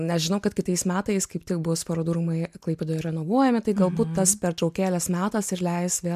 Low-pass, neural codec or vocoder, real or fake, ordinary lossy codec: 14.4 kHz; none; real; MP3, 96 kbps